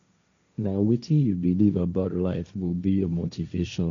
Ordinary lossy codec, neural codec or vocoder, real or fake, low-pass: MP3, 64 kbps; codec, 16 kHz, 1.1 kbps, Voila-Tokenizer; fake; 7.2 kHz